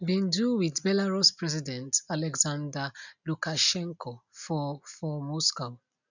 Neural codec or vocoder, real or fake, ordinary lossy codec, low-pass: none; real; none; 7.2 kHz